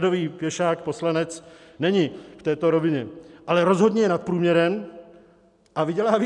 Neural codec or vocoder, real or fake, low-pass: none; real; 10.8 kHz